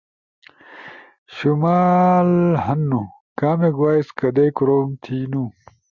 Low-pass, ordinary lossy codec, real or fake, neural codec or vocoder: 7.2 kHz; Opus, 64 kbps; real; none